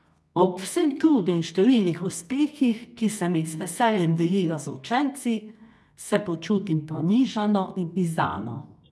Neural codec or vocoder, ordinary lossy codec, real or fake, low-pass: codec, 24 kHz, 0.9 kbps, WavTokenizer, medium music audio release; none; fake; none